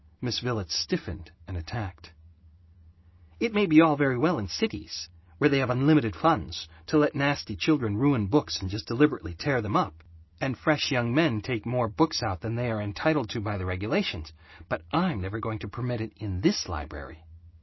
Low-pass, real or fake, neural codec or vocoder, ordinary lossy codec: 7.2 kHz; real; none; MP3, 24 kbps